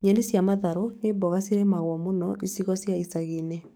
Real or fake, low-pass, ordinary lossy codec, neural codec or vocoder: fake; none; none; codec, 44.1 kHz, 7.8 kbps, DAC